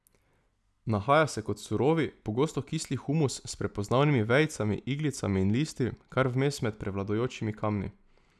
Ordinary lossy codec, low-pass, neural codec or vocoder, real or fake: none; none; none; real